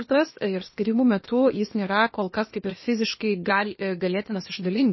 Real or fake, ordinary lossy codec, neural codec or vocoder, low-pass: fake; MP3, 24 kbps; codec, 16 kHz, 0.8 kbps, ZipCodec; 7.2 kHz